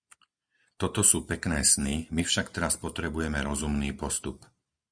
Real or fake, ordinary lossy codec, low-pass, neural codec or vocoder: real; Opus, 64 kbps; 9.9 kHz; none